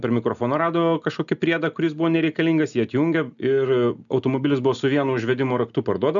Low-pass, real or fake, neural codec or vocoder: 7.2 kHz; real; none